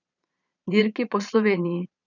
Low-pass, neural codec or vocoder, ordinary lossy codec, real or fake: 7.2 kHz; vocoder, 22.05 kHz, 80 mel bands, Vocos; none; fake